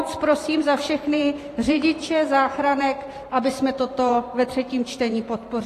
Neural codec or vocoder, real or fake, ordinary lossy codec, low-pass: vocoder, 44.1 kHz, 128 mel bands every 512 samples, BigVGAN v2; fake; AAC, 48 kbps; 14.4 kHz